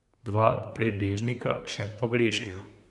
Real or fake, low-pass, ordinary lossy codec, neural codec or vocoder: fake; 10.8 kHz; none; codec, 24 kHz, 1 kbps, SNAC